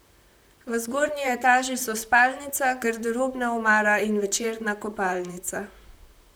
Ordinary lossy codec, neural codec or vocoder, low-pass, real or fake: none; vocoder, 44.1 kHz, 128 mel bands, Pupu-Vocoder; none; fake